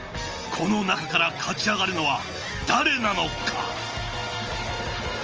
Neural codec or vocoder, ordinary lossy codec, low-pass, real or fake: none; Opus, 24 kbps; 7.2 kHz; real